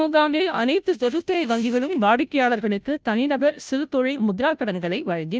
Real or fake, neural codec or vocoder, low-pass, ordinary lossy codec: fake; codec, 16 kHz, 0.5 kbps, FunCodec, trained on Chinese and English, 25 frames a second; none; none